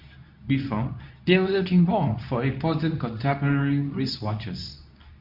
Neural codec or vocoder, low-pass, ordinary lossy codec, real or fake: codec, 24 kHz, 0.9 kbps, WavTokenizer, medium speech release version 2; 5.4 kHz; none; fake